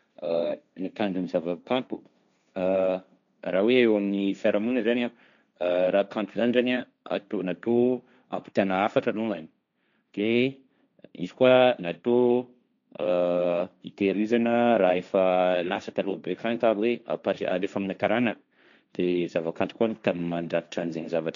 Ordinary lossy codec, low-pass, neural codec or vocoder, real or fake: none; 7.2 kHz; codec, 16 kHz, 1.1 kbps, Voila-Tokenizer; fake